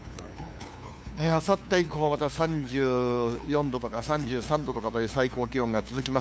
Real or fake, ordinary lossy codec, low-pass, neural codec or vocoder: fake; none; none; codec, 16 kHz, 2 kbps, FunCodec, trained on LibriTTS, 25 frames a second